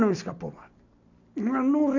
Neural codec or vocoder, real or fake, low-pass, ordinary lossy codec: none; real; 7.2 kHz; none